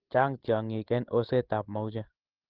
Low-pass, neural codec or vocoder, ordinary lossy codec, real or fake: 5.4 kHz; codec, 16 kHz, 16 kbps, FreqCodec, larger model; Opus, 16 kbps; fake